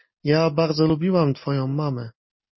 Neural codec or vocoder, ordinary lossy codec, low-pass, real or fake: none; MP3, 24 kbps; 7.2 kHz; real